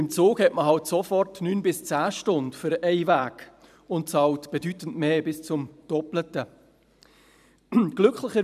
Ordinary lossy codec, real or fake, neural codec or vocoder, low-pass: none; fake; vocoder, 44.1 kHz, 128 mel bands every 512 samples, BigVGAN v2; 14.4 kHz